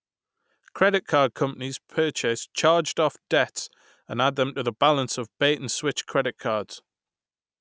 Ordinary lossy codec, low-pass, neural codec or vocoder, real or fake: none; none; none; real